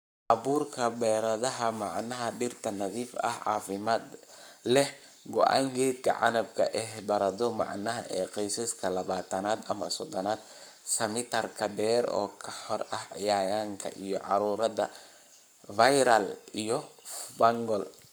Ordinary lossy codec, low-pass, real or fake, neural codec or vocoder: none; none; fake; codec, 44.1 kHz, 7.8 kbps, Pupu-Codec